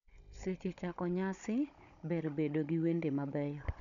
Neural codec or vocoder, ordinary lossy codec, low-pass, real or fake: codec, 16 kHz, 16 kbps, FunCodec, trained on Chinese and English, 50 frames a second; none; 7.2 kHz; fake